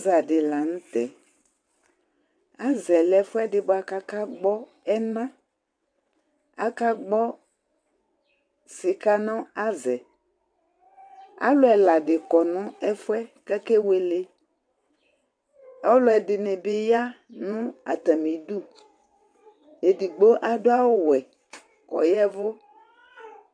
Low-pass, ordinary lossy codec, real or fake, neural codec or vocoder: 9.9 kHz; MP3, 64 kbps; fake; vocoder, 22.05 kHz, 80 mel bands, WaveNeXt